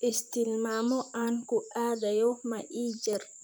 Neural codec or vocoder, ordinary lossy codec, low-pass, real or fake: vocoder, 44.1 kHz, 128 mel bands, Pupu-Vocoder; none; none; fake